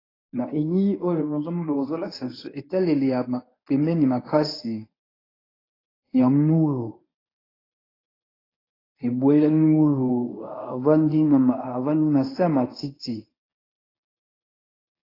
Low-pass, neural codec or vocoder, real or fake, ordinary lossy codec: 5.4 kHz; codec, 24 kHz, 0.9 kbps, WavTokenizer, medium speech release version 1; fake; AAC, 24 kbps